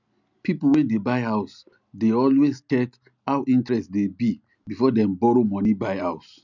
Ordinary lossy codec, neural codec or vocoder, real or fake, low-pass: none; none; real; 7.2 kHz